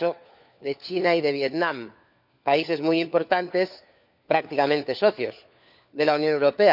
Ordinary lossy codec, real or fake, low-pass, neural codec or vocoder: none; fake; 5.4 kHz; codec, 16 kHz, 4 kbps, FunCodec, trained on LibriTTS, 50 frames a second